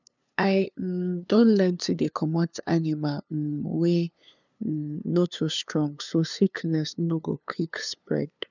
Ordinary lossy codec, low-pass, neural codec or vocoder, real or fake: none; 7.2 kHz; codec, 16 kHz, 2 kbps, FunCodec, trained on LibriTTS, 25 frames a second; fake